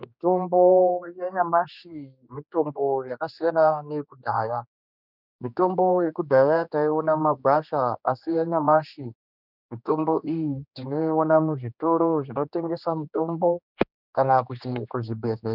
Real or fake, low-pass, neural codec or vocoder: fake; 5.4 kHz; codec, 16 kHz, 2 kbps, X-Codec, HuBERT features, trained on general audio